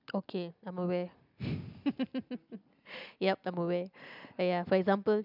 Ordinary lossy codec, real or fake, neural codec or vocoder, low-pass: none; real; none; 5.4 kHz